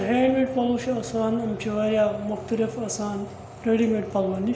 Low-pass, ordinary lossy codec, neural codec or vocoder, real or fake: none; none; none; real